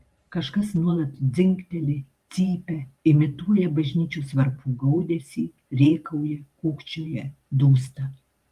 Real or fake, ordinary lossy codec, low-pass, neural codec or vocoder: fake; Opus, 32 kbps; 14.4 kHz; vocoder, 44.1 kHz, 128 mel bands every 512 samples, BigVGAN v2